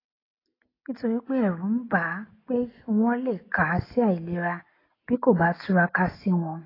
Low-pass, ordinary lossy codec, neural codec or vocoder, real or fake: 5.4 kHz; AAC, 24 kbps; none; real